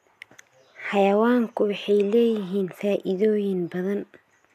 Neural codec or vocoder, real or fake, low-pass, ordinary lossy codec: none; real; 14.4 kHz; none